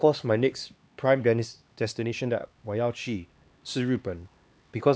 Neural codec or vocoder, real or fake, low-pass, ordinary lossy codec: codec, 16 kHz, 2 kbps, X-Codec, HuBERT features, trained on LibriSpeech; fake; none; none